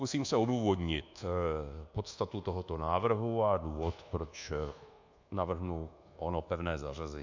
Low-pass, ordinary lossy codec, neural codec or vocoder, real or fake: 7.2 kHz; AAC, 48 kbps; codec, 24 kHz, 1.2 kbps, DualCodec; fake